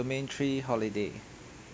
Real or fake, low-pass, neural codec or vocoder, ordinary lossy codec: real; none; none; none